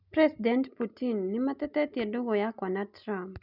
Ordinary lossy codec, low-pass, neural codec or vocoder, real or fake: none; 5.4 kHz; none; real